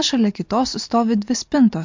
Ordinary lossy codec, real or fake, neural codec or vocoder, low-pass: MP3, 48 kbps; real; none; 7.2 kHz